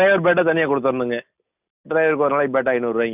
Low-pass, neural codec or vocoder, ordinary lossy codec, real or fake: 3.6 kHz; none; none; real